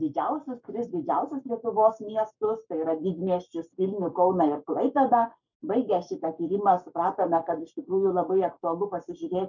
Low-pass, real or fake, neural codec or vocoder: 7.2 kHz; fake; codec, 44.1 kHz, 7.8 kbps, Pupu-Codec